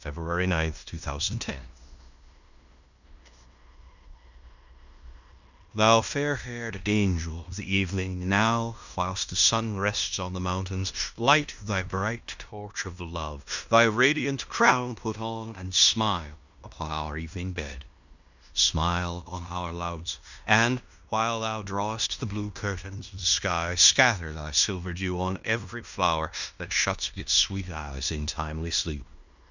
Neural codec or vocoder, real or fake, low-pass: codec, 16 kHz in and 24 kHz out, 0.9 kbps, LongCat-Audio-Codec, fine tuned four codebook decoder; fake; 7.2 kHz